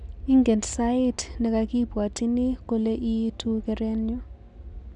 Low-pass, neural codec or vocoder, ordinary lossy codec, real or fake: 10.8 kHz; none; none; real